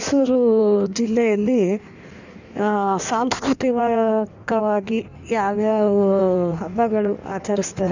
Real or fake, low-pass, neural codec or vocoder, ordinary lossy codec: fake; 7.2 kHz; codec, 16 kHz in and 24 kHz out, 1.1 kbps, FireRedTTS-2 codec; none